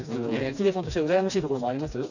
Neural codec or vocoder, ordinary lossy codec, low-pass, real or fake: codec, 16 kHz, 2 kbps, FreqCodec, smaller model; none; 7.2 kHz; fake